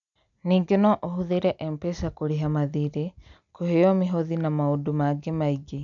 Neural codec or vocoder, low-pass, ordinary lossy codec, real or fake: none; 7.2 kHz; none; real